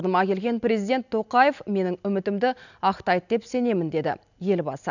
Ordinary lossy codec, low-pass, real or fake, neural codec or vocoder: none; 7.2 kHz; real; none